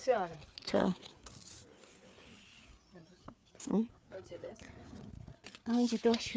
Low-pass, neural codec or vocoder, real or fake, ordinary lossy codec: none; codec, 16 kHz, 4 kbps, FreqCodec, larger model; fake; none